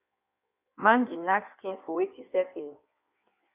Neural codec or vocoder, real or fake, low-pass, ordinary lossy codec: codec, 16 kHz in and 24 kHz out, 1.1 kbps, FireRedTTS-2 codec; fake; 3.6 kHz; Opus, 64 kbps